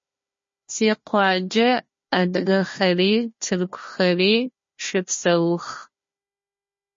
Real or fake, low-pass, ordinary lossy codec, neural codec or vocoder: fake; 7.2 kHz; MP3, 32 kbps; codec, 16 kHz, 1 kbps, FunCodec, trained on Chinese and English, 50 frames a second